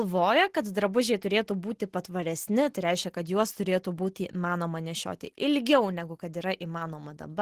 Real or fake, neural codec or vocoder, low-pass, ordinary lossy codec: real; none; 14.4 kHz; Opus, 16 kbps